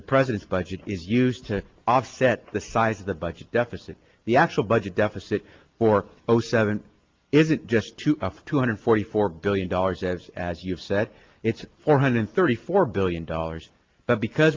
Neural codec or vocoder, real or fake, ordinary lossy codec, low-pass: none; real; Opus, 32 kbps; 7.2 kHz